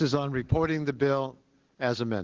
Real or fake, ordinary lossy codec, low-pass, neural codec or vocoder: real; Opus, 16 kbps; 7.2 kHz; none